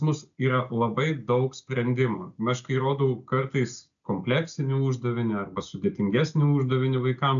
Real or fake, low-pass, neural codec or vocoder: real; 7.2 kHz; none